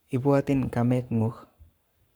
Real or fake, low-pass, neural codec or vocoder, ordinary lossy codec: fake; none; codec, 44.1 kHz, 7.8 kbps, Pupu-Codec; none